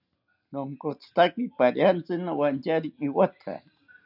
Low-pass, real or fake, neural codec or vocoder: 5.4 kHz; real; none